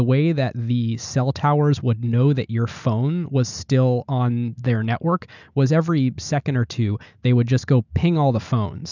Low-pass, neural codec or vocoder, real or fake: 7.2 kHz; none; real